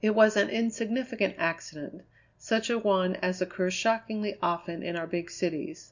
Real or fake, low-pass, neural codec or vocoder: real; 7.2 kHz; none